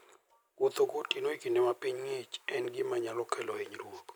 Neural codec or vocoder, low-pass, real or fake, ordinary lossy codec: none; none; real; none